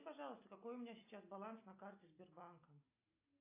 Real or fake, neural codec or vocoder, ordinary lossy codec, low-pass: real; none; AAC, 24 kbps; 3.6 kHz